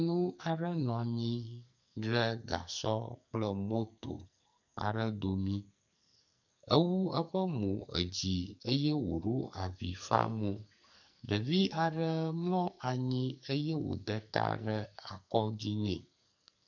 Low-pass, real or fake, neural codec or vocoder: 7.2 kHz; fake; codec, 44.1 kHz, 2.6 kbps, SNAC